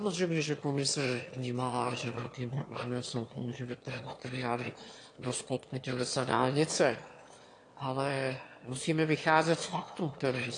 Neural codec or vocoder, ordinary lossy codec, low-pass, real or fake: autoencoder, 22.05 kHz, a latent of 192 numbers a frame, VITS, trained on one speaker; AAC, 48 kbps; 9.9 kHz; fake